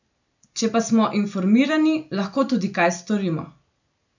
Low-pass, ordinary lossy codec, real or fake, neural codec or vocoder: 7.2 kHz; none; real; none